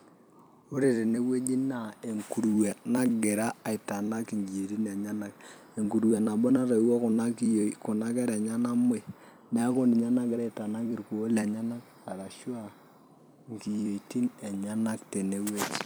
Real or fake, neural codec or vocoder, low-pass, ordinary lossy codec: fake; vocoder, 44.1 kHz, 128 mel bands every 256 samples, BigVGAN v2; none; none